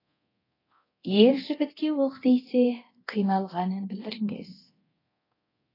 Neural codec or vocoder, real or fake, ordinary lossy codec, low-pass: codec, 24 kHz, 0.9 kbps, DualCodec; fake; AAC, 24 kbps; 5.4 kHz